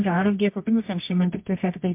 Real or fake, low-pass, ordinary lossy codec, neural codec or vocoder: fake; 3.6 kHz; MP3, 32 kbps; codec, 24 kHz, 0.9 kbps, WavTokenizer, medium music audio release